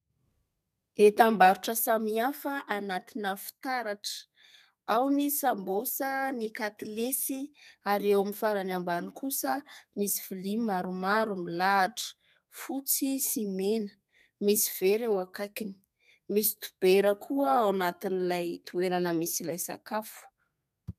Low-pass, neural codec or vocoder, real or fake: 14.4 kHz; codec, 32 kHz, 1.9 kbps, SNAC; fake